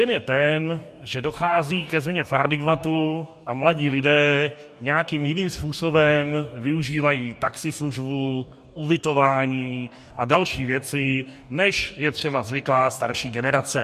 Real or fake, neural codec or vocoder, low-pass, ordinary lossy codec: fake; codec, 44.1 kHz, 2.6 kbps, DAC; 14.4 kHz; MP3, 96 kbps